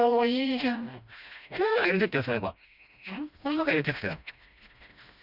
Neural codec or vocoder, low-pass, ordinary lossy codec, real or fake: codec, 16 kHz, 1 kbps, FreqCodec, smaller model; 5.4 kHz; none; fake